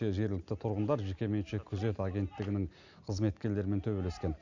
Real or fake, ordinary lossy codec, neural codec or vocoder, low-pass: real; none; none; 7.2 kHz